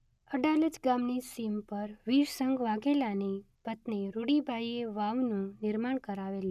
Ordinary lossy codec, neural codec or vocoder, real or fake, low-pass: none; none; real; 14.4 kHz